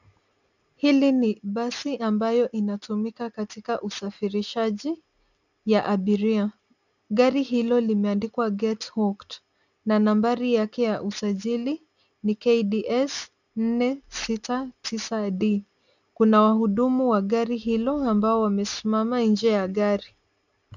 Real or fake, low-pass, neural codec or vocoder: real; 7.2 kHz; none